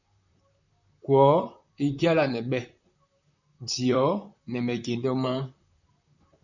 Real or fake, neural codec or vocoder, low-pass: fake; vocoder, 44.1 kHz, 128 mel bands, Pupu-Vocoder; 7.2 kHz